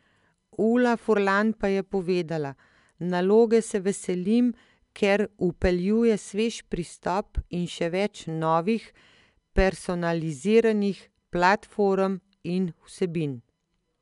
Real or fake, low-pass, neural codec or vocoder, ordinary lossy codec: real; 10.8 kHz; none; MP3, 96 kbps